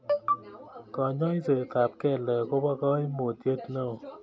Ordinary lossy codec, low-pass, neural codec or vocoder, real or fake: none; none; none; real